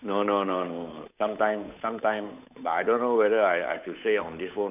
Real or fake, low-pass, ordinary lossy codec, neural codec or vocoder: fake; 3.6 kHz; none; codec, 16 kHz, 8 kbps, FunCodec, trained on Chinese and English, 25 frames a second